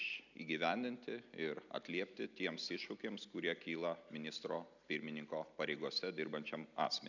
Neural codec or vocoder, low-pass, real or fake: none; 7.2 kHz; real